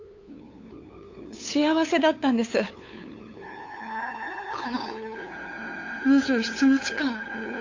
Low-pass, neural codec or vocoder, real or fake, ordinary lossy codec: 7.2 kHz; codec, 16 kHz, 8 kbps, FunCodec, trained on LibriTTS, 25 frames a second; fake; AAC, 48 kbps